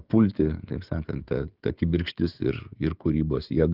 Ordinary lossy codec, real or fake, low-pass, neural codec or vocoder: Opus, 32 kbps; fake; 5.4 kHz; codec, 16 kHz, 16 kbps, FreqCodec, smaller model